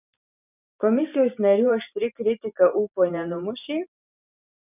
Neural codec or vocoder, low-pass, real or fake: vocoder, 44.1 kHz, 128 mel bands every 512 samples, BigVGAN v2; 3.6 kHz; fake